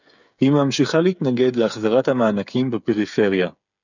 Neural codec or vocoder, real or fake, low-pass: codec, 16 kHz, 8 kbps, FreqCodec, smaller model; fake; 7.2 kHz